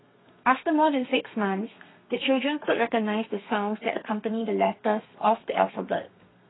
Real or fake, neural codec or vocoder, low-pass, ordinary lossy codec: fake; codec, 32 kHz, 1.9 kbps, SNAC; 7.2 kHz; AAC, 16 kbps